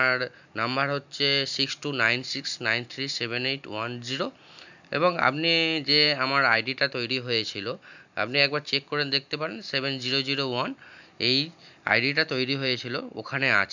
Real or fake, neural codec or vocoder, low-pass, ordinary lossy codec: real; none; 7.2 kHz; none